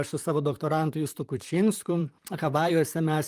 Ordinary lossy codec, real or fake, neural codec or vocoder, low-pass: Opus, 32 kbps; fake; vocoder, 44.1 kHz, 128 mel bands, Pupu-Vocoder; 14.4 kHz